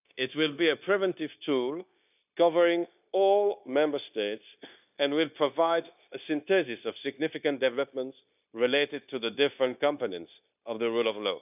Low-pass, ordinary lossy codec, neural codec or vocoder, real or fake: 3.6 kHz; none; codec, 16 kHz, 0.9 kbps, LongCat-Audio-Codec; fake